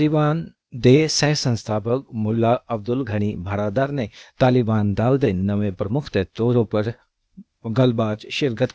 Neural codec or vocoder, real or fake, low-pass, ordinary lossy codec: codec, 16 kHz, 0.8 kbps, ZipCodec; fake; none; none